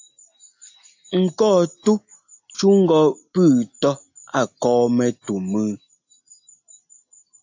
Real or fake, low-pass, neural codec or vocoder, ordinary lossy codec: real; 7.2 kHz; none; AAC, 48 kbps